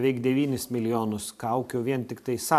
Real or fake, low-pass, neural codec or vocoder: fake; 14.4 kHz; vocoder, 44.1 kHz, 128 mel bands every 512 samples, BigVGAN v2